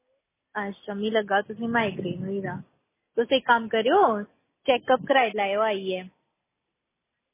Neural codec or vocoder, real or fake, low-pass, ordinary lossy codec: none; real; 3.6 kHz; MP3, 16 kbps